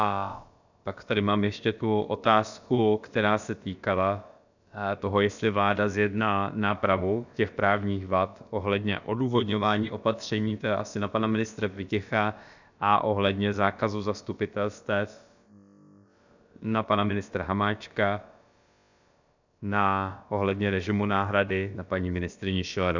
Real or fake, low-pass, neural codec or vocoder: fake; 7.2 kHz; codec, 16 kHz, about 1 kbps, DyCAST, with the encoder's durations